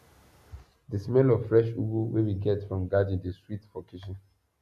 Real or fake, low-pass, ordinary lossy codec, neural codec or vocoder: real; 14.4 kHz; AAC, 96 kbps; none